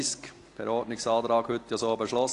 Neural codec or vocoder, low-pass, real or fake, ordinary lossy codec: none; 10.8 kHz; real; AAC, 48 kbps